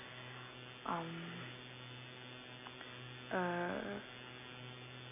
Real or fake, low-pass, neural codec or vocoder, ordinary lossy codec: real; 3.6 kHz; none; none